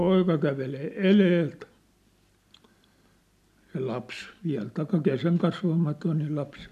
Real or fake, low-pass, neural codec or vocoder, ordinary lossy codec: real; 14.4 kHz; none; none